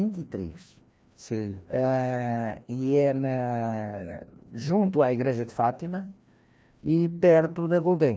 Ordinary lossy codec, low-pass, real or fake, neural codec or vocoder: none; none; fake; codec, 16 kHz, 1 kbps, FreqCodec, larger model